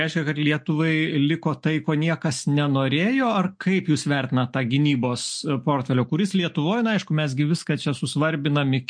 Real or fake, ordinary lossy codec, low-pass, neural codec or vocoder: real; MP3, 48 kbps; 9.9 kHz; none